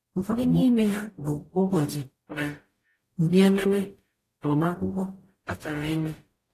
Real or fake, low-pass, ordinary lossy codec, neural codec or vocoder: fake; 14.4 kHz; AAC, 48 kbps; codec, 44.1 kHz, 0.9 kbps, DAC